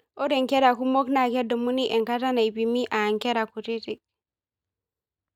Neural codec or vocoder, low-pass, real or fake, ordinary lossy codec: none; 19.8 kHz; real; none